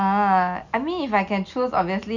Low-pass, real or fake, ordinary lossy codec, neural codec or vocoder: 7.2 kHz; real; none; none